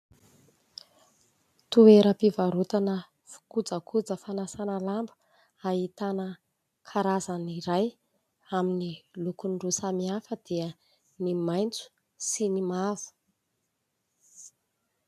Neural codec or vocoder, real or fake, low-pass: none; real; 14.4 kHz